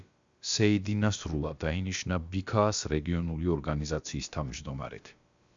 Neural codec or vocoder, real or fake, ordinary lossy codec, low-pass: codec, 16 kHz, about 1 kbps, DyCAST, with the encoder's durations; fake; Opus, 64 kbps; 7.2 kHz